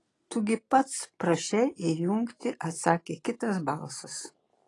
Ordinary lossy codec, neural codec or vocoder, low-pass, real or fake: AAC, 32 kbps; none; 10.8 kHz; real